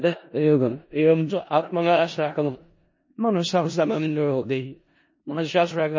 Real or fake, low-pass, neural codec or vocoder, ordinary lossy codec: fake; 7.2 kHz; codec, 16 kHz in and 24 kHz out, 0.4 kbps, LongCat-Audio-Codec, four codebook decoder; MP3, 32 kbps